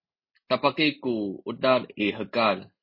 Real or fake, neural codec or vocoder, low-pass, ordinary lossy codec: real; none; 5.4 kHz; MP3, 24 kbps